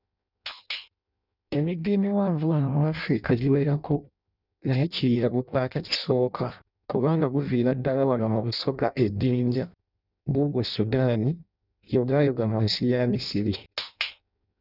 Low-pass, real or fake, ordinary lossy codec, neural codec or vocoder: 5.4 kHz; fake; none; codec, 16 kHz in and 24 kHz out, 0.6 kbps, FireRedTTS-2 codec